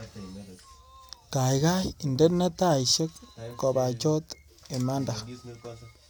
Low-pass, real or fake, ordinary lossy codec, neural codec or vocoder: none; real; none; none